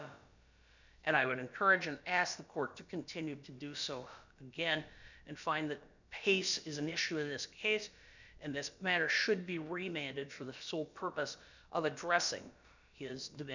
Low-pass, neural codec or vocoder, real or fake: 7.2 kHz; codec, 16 kHz, about 1 kbps, DyCAST, with the encoder's durations; fake